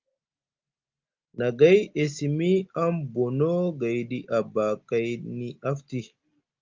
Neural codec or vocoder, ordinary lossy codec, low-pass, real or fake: none; Opus, 24 kbps; 7.2 kHz; real